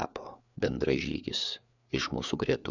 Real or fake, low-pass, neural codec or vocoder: fake; 7.2 kHz; codec, 16 kHz, 4 kbps, FreqCodec, larger model